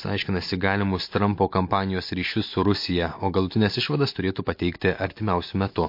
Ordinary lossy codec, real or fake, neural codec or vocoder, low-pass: MP3, 32 kbps; real; none; 5.4 kHz